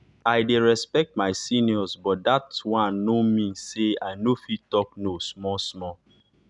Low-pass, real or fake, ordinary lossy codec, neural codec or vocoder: 10.8 kHz; real; none; none